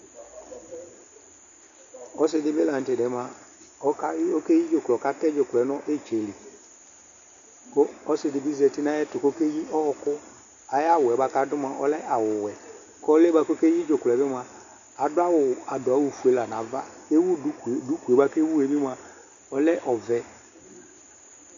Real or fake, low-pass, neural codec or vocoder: real; 7.2 kHz; none